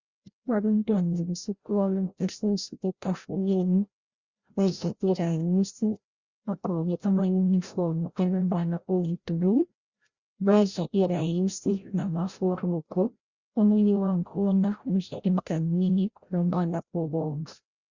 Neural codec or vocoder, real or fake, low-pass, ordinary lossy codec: codec, 16 kHz, 0.5 kbps, FreqCodec, larger model; fake; 7.2 kHz; Opus, 64 kbps